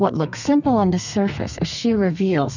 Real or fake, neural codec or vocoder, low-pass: fake; codec, 32 kHz, 1.9 kbps, SNAC; 7.2 kHz